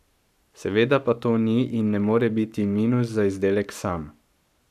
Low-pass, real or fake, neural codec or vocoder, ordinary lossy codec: 14.4 kHz; fake; codec, 44.1 kHz, 7.8 kbps, Pupu-Codec; none